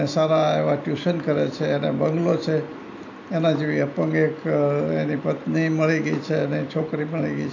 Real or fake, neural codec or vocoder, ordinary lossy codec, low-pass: real; none; AAC, 48 kbps; 7.2 kHz